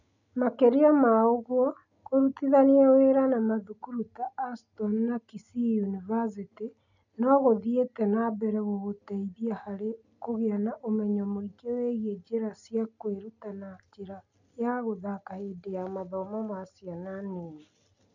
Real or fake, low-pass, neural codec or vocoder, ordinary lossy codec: real; 7.2 kHz; none; none